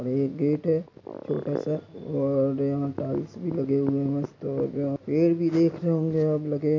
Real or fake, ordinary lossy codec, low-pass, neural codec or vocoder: real; MP3, 64 kbps; 7.2 kHz; none